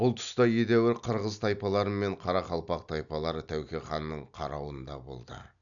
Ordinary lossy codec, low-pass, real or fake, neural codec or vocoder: none; 7.2 kHz; real; none